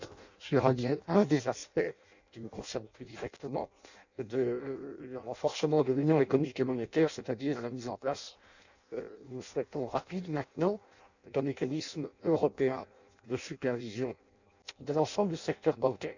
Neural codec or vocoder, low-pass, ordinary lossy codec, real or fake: codec, 16 kHz in and 24 kHz out, 0.6 kbps, FireRedTTS-2 codec; 7.2 kHz; none; fake